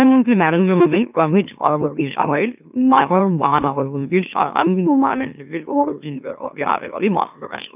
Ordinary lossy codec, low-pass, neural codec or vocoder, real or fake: none; 3.6 kHz; autoencoder, 44.1 kHz, a latent of 192 numbers a frame, MeloTTS; fake